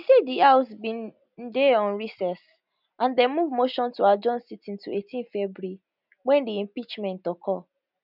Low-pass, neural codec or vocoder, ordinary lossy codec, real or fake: 5.4 kHz; none; none; real